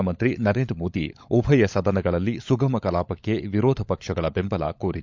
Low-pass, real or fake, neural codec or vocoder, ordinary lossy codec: 7.2 kHz; fake; codec, 16 kHz, 16 kbps, FunCodec, trained on LibriTTS, 50 frames a second; MP3, 64 kbps